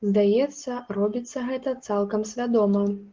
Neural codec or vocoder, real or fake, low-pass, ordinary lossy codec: none; real; 7.2 kHz; Opus, 16 kbps